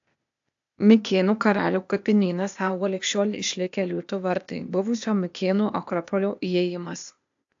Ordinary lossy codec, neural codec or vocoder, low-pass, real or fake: AAC, 64 kbps; codec, 16 kHz, 0.8 kbps, ZipCodec; 7.2 kHz; fake